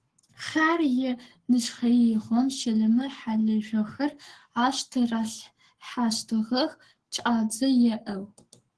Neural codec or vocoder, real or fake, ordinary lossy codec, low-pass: codec, 44.1 kHz, 7.8 kbps, Pupu-Codec; fake; Opus, 16 kbps; 10.8 kHz